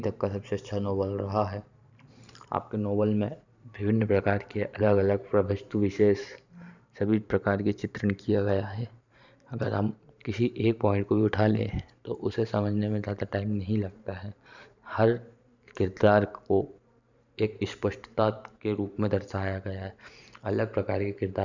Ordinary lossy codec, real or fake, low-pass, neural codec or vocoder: none; fake; 7.2 kHz; vocoder, 44.1 kHz, 128 mel bands every 512 samples, BigVGAN v2